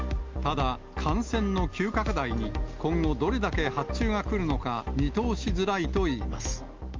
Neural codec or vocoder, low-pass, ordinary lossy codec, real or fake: none; 7.2 kHz; Opus, 24 kbps; real